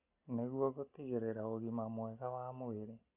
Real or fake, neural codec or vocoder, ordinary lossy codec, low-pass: real; none; none; 3.6 kHz